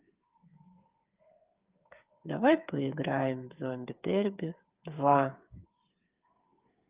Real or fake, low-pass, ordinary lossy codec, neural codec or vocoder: fake; 3.6 kHz; Opus, 24 kbps; codec, 16 kHz, 8 kbps, FreqCodec, smaller model